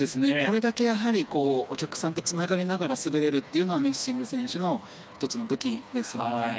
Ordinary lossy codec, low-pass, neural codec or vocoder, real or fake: none; none; codec, 16 kHz, 2 kbps, FreqCodec, smaller model; fake